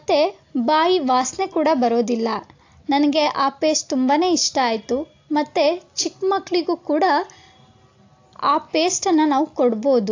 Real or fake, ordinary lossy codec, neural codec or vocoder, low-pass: real; AAC, 48 kbps; none; 7.2 kHz